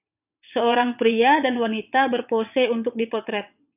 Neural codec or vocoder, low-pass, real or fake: vocoder, 22.05 kHz, 80 mel bands, WaveNeXt; 3.6 kHz; fake